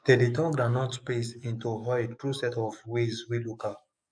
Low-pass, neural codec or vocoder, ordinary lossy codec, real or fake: 9.9 kHz; codec, 44.1 kHz, 7.8 kbps, DAC; none; fake